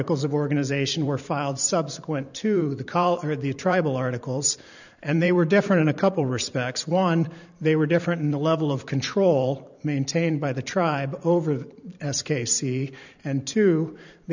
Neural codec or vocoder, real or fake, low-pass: vocoder, 44.1 kHz, 128 mel bands every 512 samples, BigVGAN v2; fake; 7.2 kHz